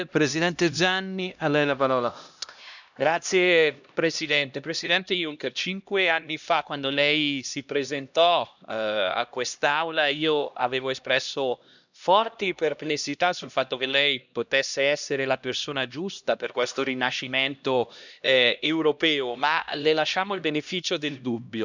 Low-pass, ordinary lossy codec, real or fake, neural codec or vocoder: 7.2 kHz; none; fake; codec, 16 kHz, 1 kbps, X-Codec, HuBERT features, trained on LibriSpeech